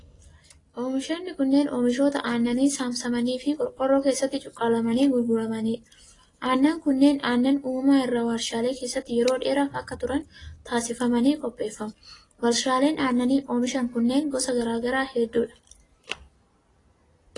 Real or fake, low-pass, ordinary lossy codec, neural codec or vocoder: real; 10.8 kHz; AAC, 32 kbps; none